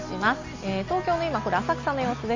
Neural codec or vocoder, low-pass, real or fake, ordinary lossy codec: none; 7.2 kHz; real; AAC, 48 kbps